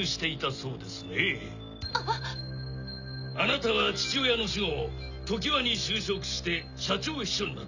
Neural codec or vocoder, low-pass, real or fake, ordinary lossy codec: none; 7.2 kHz; real; none